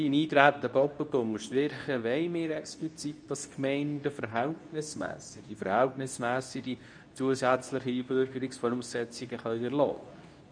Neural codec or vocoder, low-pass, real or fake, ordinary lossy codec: codec, 24 kHz, 0.9 kbps, WavTokenizer, medium speech release version 2; 9.9 kHz; fake; none